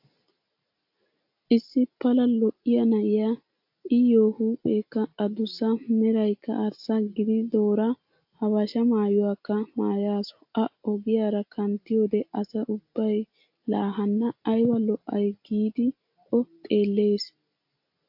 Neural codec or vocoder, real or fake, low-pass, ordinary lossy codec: none; real; 5.4 kHz; AAC, 48 kbps